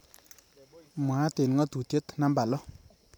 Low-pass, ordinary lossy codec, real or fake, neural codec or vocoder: none; none; fake; vocoder, 44.1 kHz, 128 mel bands every 512 samples, BigVGAN v2